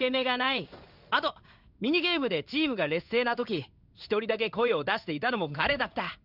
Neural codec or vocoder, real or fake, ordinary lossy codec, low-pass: codec, 16 kHz in and 24 kHz out, 1 kbps, XY-Tokenizer; fake; none; 5.4 kHz